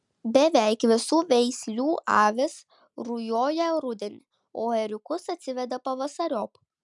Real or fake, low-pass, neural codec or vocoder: real; 10.8 kHz; none